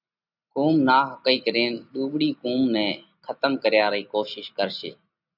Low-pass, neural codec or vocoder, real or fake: 5.4 kHz; none; real